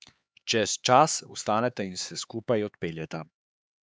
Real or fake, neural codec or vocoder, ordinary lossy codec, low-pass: fake; codec, 16 kHz, 4 kbps, X-Codec, HuBERT features, trained on LibriSpeech; none; none